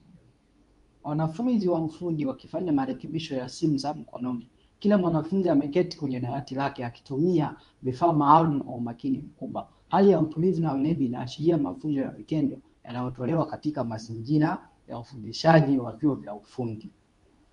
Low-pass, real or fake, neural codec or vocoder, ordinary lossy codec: 10.8 kHz; fake; codec, 24 kHz, 0.9 kbps, WavTokenizer, medium speech release version 2; AAC, 96 kbps